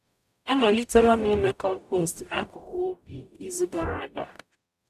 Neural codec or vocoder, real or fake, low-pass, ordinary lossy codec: codec, 44.1 kHz, 0.9 kbps, DAC; fake; 14.4 kHz; none